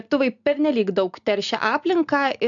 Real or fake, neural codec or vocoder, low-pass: real; none; 7.2 kHz